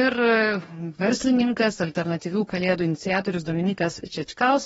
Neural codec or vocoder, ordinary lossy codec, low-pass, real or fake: codec, 44.1 kHz, 2.6 kbps, DAC; AAC, 24 kbps; 19.8 kHz; fake